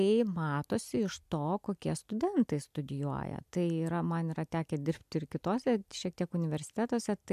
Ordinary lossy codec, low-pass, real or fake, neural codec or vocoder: AAC, 96 kbps; 14.4 kHz; real; none